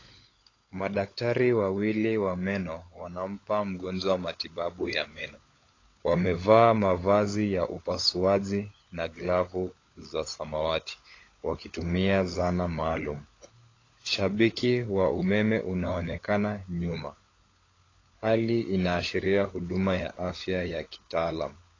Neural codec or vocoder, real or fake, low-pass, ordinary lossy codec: codec, 16 kHz, 16 kbps, FunCodec, trained on LibriTTS, 50 frames a second; fake; 7.2 kHz; AAC, 32 kbps